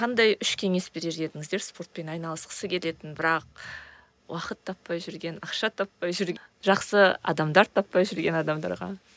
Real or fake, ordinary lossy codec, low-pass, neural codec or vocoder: real; none; none; none